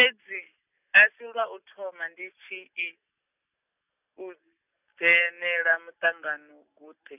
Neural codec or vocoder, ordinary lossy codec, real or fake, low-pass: none; none; real; 3.6 kHz